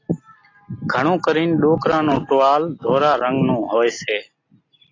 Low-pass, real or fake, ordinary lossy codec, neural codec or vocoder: 7.2 kHz; real; AAC, 32 kbps; none